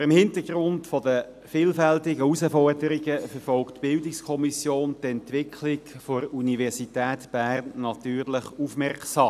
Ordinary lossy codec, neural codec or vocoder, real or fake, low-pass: none; none; real; 14.4 kHz